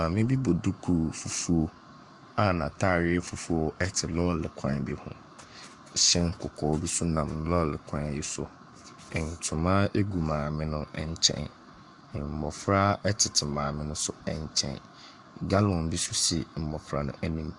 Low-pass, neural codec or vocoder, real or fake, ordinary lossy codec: 10.8 kHz; codec, 44.1 kHz, 7.8 kbps, Pupu-Codec; fake; MP3, 96 kbps